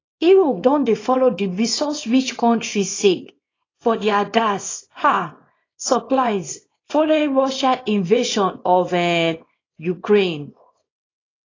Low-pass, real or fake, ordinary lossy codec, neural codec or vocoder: 7.2 kHz; fake; AAC, 32 kbps; codec, 24 kHz, 0.9 kbps, WavTokenizer, small release